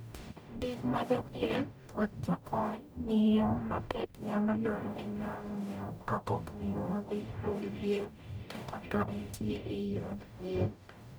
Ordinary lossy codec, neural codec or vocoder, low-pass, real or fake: none; codec, 44.1 kHz, 0.9 kbps, DAC; none; fake